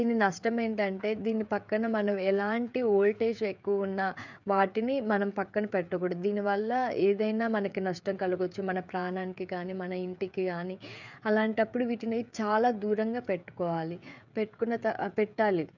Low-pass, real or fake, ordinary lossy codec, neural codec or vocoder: 7.2 kHz; fake; none; codec, 16 kHz, 16 kbps, FreqCodec, smaller model